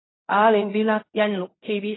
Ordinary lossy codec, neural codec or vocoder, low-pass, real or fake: AAC, 16 kbps; codec, 16 kHz in and 24 kHz out, 0.4 kbps, LongCat-Audio-Codec, fine tuned four codebook decoder; 7.2 kHz; fake